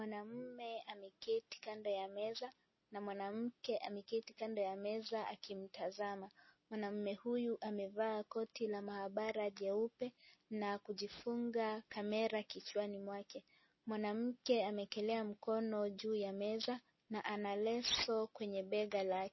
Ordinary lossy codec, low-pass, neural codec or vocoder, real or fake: MP3, 24 kbps; 7.2 kHz; none; real